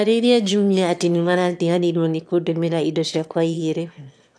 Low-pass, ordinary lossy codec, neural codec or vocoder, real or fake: none; none; autoencoder, 22.05 kHz, a latent of 192 numbers a frame, VITS, trained on one speaker; fake